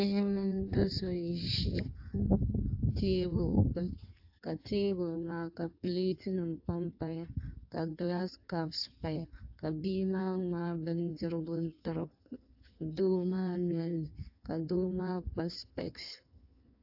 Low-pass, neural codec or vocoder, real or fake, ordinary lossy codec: 5.4 kHz; codec, 16 kHz in and 24 kHz out, 1.1 kbps, FireRedTTS-2 codec; fake; AAC, 48 kbps